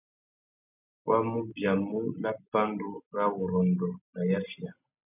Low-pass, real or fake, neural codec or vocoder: 3.6 kHz; real; none